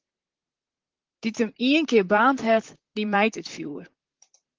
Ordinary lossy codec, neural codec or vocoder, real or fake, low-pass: Opus, 16 kbps; vocoder, 44.1 kHz, 128 mel bands, Pupu-Vocoder; fake; 7.2 kHz